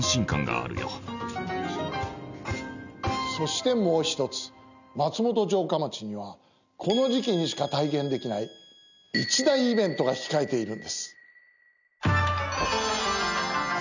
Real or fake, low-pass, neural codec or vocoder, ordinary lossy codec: real; 7.2 kHz; none; none